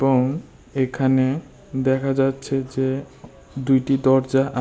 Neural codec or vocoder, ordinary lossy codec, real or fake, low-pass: none; none; real; none